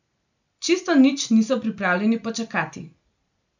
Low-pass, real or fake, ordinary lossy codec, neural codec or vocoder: 7.2 kHz; real; none; none